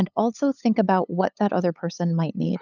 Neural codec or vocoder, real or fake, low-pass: codec, 16 kHz, 8 kbps, FunCodec, trained on LibriTTS, 25 frames a second; fake; 7.2 kHz